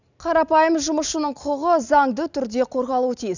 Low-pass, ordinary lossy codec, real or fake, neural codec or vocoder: 7.2 kHz; none; real; none